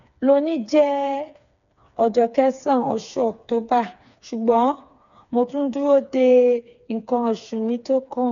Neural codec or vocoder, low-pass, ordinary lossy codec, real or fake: codec, 16 kHz, 4 kbps, FreqCodec, smaller model; 7.2 kHz; none; fake